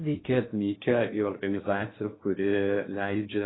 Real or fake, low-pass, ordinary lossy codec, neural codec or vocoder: fake; 7.2 kHz; AAC, 16 kbps; codec, 16 kHz in and 24 kHz out, 0.6 kbps, FocalCodec, streaming, 2048 codes